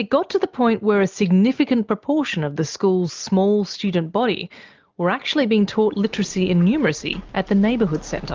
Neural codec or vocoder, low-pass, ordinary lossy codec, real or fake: none; 7.2 kHz; Opus, 24 kbps; real